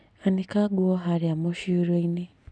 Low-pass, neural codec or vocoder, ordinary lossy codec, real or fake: none; none; none; real